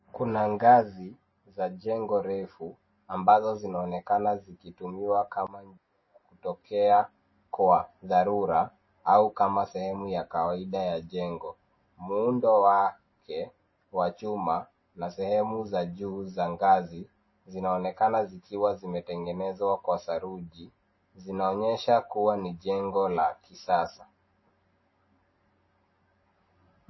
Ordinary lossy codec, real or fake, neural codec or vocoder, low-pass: MP3, 24 kbps; real; none; 7.2 kHz